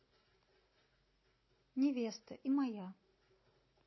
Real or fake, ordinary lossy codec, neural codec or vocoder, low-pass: real; MP3, 24 kbps; none; 7.2 kHz